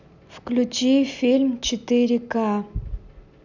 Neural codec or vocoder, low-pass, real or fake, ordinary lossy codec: none; 7.2 kHz; real; none